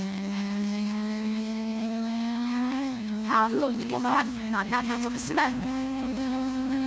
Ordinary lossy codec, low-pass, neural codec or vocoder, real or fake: none; none; codec, 16 kHz, 1 kbps, FunCodec, trained on LibriTTS, 50 frames a second; fake